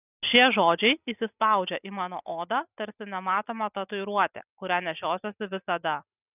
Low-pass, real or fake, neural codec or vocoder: 3.6 kHz; real; none